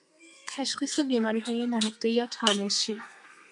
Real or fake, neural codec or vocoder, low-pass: fake; codec, 32 kHz, 1.9 kbps, SNAC; 10.8 kHz